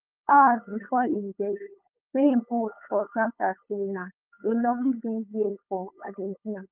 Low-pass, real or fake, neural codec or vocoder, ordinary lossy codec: 3.6 kHz; fake; codec, 16 kHz, 8 kbps, FunCodec, trained on LibriTTS, 25 frames a second; Opus, 24 kbps